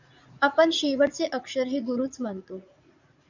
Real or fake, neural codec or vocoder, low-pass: fake; vocoder, 44.1 kHz, 80 mel bands, Vocos; 7.2 kHz